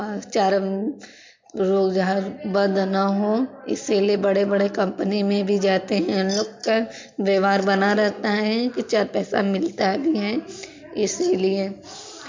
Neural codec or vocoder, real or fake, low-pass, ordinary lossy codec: none; real; 7.2 kHz; MP3, 48 kbps